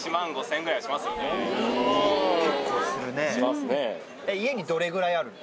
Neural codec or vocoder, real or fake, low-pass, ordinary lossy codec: none; real; none; none